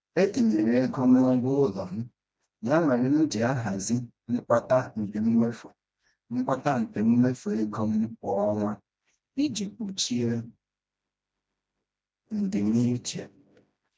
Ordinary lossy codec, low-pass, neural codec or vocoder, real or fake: none; none; codec, 16 kHz, 1 kbps, FreqCodec, smaller model; fake